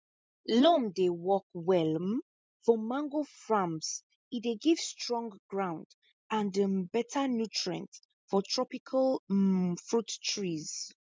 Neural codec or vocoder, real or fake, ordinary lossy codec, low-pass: none; real; none; none